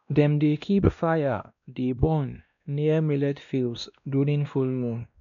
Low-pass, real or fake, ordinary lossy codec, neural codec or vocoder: 7.2 kHz; fake; none; codec, 16 kHz, 1 kbps, X-Codec, WavLM features, trained on Multilingual LibriSpeech